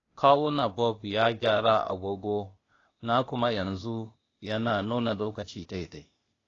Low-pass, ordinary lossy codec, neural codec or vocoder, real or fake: 7.2 kHz; AAC, 32 kbps; codec, 16 kHz, 0.8 kbps, ZipCodec; fake